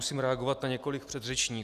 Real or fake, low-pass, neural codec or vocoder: real; 14.4 kHz; none